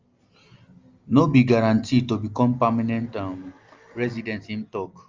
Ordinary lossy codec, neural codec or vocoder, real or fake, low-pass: Opus, 32 kbps; none; real; 7.2 kHz